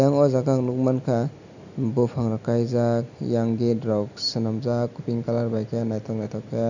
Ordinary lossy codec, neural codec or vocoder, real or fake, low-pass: none; none; real; 7.2 kHz